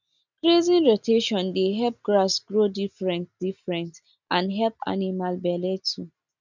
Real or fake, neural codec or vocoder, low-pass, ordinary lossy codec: real; none; 7.2 kHz; none